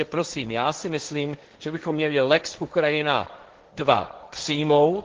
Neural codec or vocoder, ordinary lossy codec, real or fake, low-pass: codec, 16 kHz, 1.1 kbps, Voila-Tokenizer; Opus, 16 kbps; fake; 7.2 kHz